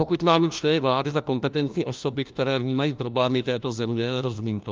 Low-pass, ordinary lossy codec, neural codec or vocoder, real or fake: 7.2 kHz; Opus, 24 kbps; codec, 16 kHz, 1 kbps, FunCodec, trained on LibriTTS, 50 frames a second; fake